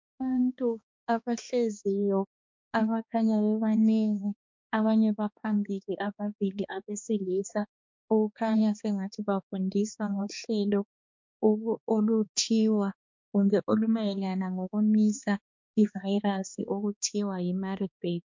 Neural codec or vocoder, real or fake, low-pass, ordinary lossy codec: codec, 16 kHz, 2 kbps, X-Codec, HuBERT features, trained on balanced general audio; fake; 7.2 kHz; MP3, 64 kbps